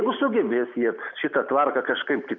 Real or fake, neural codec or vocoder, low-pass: real; none; 7.2 kHz